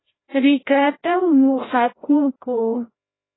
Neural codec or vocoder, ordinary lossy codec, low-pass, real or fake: codec, 16 kHz, 0.5 kbps, FreqCodec, larger model; AAC, 16 kbps; 7.2 kHz; fake